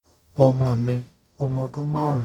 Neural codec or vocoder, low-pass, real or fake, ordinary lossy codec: codec, 44.1 kHz, 0.9 kbps, DAC; 19.8 kHz; fake; none